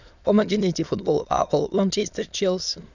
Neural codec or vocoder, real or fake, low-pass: autoencoder, 22.05 kHz, a latent of 192 numbers a frame, VITS, trained on many speakers; fake; 7.2 kHz